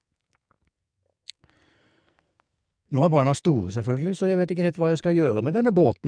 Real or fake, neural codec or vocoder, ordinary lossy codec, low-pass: fake; codec, 44.1 kHz, 2.6 kbps, SNAC; MP3, 96 kbps; 9.9 kHz